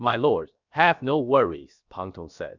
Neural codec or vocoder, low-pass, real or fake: codec, 16 kHz, about 1 kbps, DyCAST, with the encoder's durations; 7.2 kHz; fake